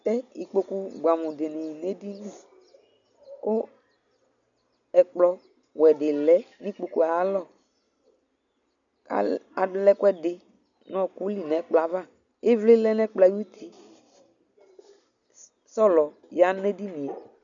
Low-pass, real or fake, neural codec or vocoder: 7.2 kHz; real; none